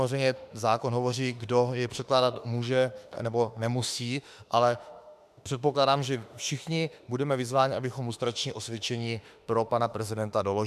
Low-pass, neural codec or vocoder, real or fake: 14.4 kHz; autoencoder, 48 kHz, 32 numbers a frame, DAC-VAE, trained on Japanese speech; fake